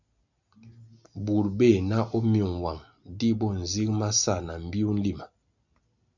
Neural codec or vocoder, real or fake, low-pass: none; real; 7.2 kHz